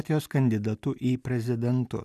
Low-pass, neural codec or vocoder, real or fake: 14.4 kHz; none; real